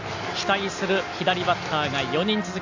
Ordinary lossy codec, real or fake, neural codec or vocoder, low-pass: AAC, 48 kbps; real; none; 7.2 kHz